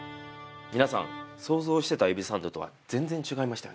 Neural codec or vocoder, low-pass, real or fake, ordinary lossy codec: none; none; real; none